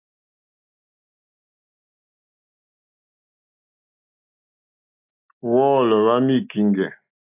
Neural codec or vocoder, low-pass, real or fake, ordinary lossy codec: none; 3.6 kHz; real; AAC, 32 kbps